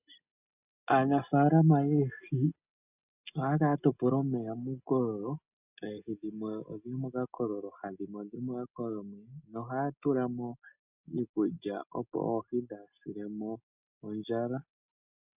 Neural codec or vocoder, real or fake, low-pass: none; real; 3.6 kHz